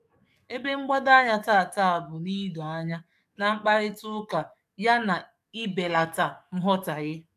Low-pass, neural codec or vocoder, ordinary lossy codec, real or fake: 14.4 kHz; codec, 44.1 kHz, 7.8 kbps, DAC; AAC, 96 kbps; fake